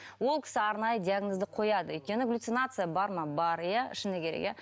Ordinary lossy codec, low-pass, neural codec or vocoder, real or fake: none; none; none; real